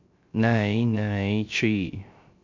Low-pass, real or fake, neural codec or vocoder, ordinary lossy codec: 7.2 kHz; fake; codec, 16 kHz, 0.3 kbps, FocalCodec; MP3, 48 kbps